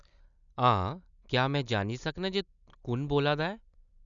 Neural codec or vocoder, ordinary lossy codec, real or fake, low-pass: none; none; real; 7.2 kHz